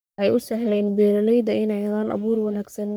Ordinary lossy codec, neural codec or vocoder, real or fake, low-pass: none; codec, 44.1 kHz, 3.4 kbps, Pupu-Codec; fake; none